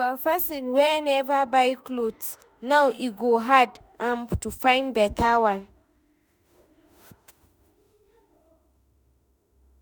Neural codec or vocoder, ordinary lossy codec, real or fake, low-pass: autoencoder, 48 kHz, 32 numbers a frame, DAC-VAE, trained on Japanese speech; none; fake; none